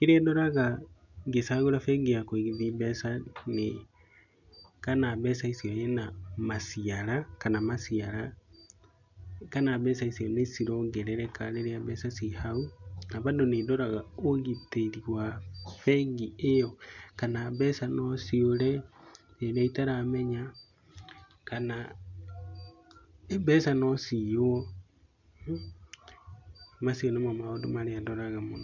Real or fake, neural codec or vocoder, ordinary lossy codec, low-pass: real; none; none; 7.2 kHz